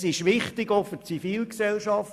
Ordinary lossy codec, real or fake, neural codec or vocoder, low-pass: AAC, 96 kbps; real; none; 14.4 kHz